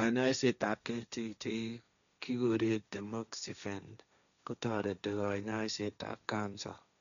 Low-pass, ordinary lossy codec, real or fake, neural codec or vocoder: 7.2 kHz; none; fake; codec, 16 kHz, 1.1 kbps, Voila-Tokenizer